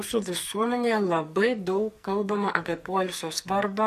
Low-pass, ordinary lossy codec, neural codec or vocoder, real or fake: 14.4 kHz; MP3, 96 kbps; codec, 44.1 kHz, 2.6 kbps, SNAC; fake